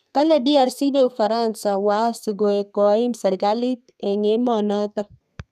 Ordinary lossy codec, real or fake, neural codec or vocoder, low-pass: none; fake; codec, 32 kHz, 1.9 kbps, SNAC; 14.4 kHz